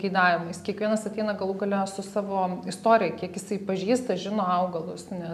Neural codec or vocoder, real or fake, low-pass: none; real; 14.4 kHz